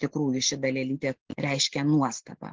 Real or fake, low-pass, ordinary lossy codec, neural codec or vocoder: real; 7.2 kHz; Opus, 16 kbps; none